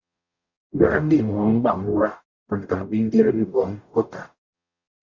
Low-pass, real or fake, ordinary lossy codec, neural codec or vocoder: 7.2 kHz; fake; Opus, 64 kbps; codec, 44.1 kHz, 0.9 kbps, DAC